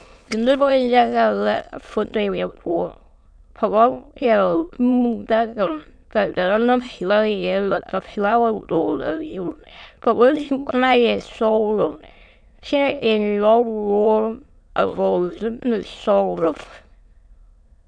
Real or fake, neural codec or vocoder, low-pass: fake; autoencoder, 22.05 kHz, a latent of 192 numbers a frame, VITS, trained on many speakers; 9.9 kHz